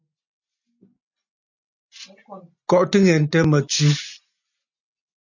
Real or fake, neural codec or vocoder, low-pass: real; none; 7.2 kHz